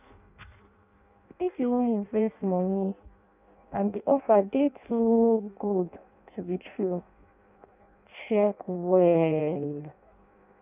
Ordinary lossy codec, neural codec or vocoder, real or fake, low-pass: none; codec, 16 kHz in and 24 kHz out, 0.6 kbps, FireRedTTS-2 codec; fake; 3.6 kHz